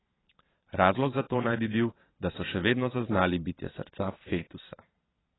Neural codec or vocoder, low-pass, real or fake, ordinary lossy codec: vocoder, 22.05 kHz, 80 mel bands, Vocos; 7.2 kHz; fake; AAC, 16 kbps